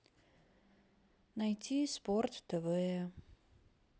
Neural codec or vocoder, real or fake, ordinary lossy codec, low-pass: none; real; none; none